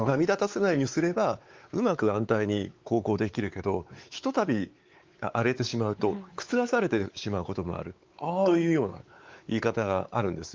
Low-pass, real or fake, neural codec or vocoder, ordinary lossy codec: 7.2 kHz; fake; codec, 16 kHz, 4 kbps, X-Codec, WavLM features, trained on Multilingual LibriSpeech; Opus, 32 kbps